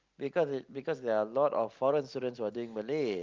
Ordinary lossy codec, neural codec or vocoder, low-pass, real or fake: Opus, 24 kbps; none; 7.2 kHz; real